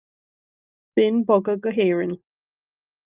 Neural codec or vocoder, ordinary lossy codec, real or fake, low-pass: none; Opus, 32 kbps; real; 3.6 kHz